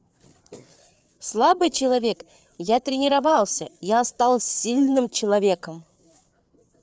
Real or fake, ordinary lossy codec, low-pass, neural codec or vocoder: fake; none; none; codec, 16 kHz, 4 kbps, FreqCodec, larger model